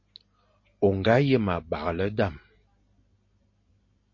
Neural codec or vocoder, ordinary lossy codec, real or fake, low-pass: none; MP3, 32 kbps; real; 7.2 kHz